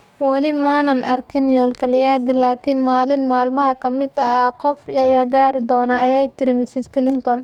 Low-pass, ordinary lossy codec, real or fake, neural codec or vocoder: 19.8 kHz; none; fake; codec, 44.1 kHz, 2.6 kbps, DAC